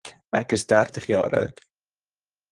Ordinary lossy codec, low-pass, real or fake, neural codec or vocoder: Opus, 24 kbps; 10.8 kHz; fake; codec, 24 kHz, 1 kbps, SNAC